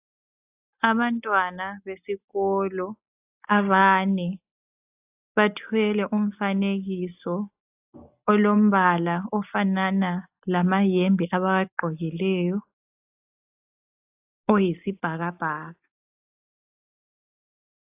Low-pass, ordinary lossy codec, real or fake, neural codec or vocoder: 3.6 kHz; AAC, 32 kbps; real; none